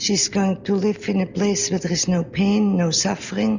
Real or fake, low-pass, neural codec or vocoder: real; 7.2 kHz; none